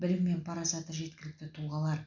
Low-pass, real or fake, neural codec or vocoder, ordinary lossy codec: 7.2 kHz; real; none; none